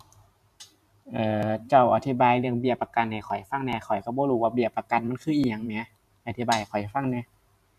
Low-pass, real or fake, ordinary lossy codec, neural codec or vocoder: 14.4 kHz; fake; none; vocoder, 44.1 kHz, 128 mel bands every 512 samples, BigVGAN v2